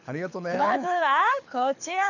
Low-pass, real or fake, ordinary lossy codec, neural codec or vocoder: 7.2 kHz; fake; none; codec, 24 kHz, 6 kbps, HILCodec